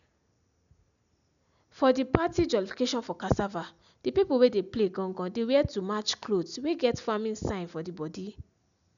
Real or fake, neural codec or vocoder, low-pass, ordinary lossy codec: real; none; 7.2 kHz; none